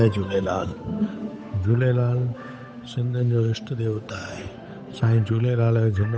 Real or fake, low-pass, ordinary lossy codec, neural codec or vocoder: fake; none; none; codec, 16 kHz, 8 kbps, FunCodec, trained on Chinese and English, 25 frames a second